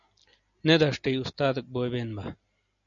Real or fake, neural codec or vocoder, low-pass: real; none; 7.2 kHz